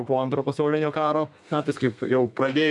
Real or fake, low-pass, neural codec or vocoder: fake; 10.8 kHz; codec, 32 kHz, 1.9 kbps, SNAC